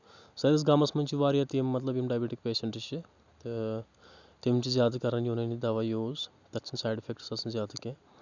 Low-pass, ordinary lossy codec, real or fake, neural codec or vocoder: 7.2 kHz; none; real; none